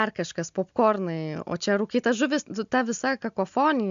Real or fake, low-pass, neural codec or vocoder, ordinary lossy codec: real; 7.2 kHz; none; MP3, 64 kbps